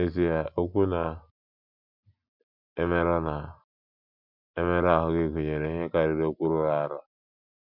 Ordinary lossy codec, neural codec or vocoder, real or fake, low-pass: MP3, 48 kbps; none; real; 5.4 kHz